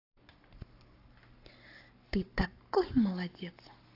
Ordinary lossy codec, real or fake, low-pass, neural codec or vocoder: AAC, 32 kbps; fake; 5.4 kHz; codec, 44.1 kHz, 7.8 kbps, Pupu-Codec